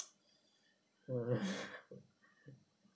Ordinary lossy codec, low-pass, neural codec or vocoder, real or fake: none; none; none; real